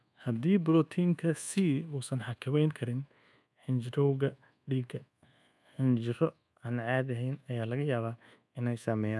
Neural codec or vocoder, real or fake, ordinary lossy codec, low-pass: codec, 24 kHz, 1.2 kbps, DualCodec; fake; none; none